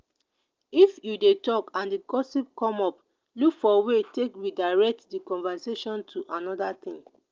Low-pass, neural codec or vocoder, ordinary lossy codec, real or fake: 7.2 kHz; none; Opus, 24 kbps; real